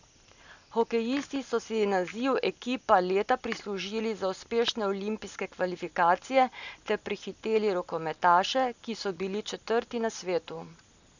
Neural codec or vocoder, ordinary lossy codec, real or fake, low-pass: none; none; real; 7.2 kHz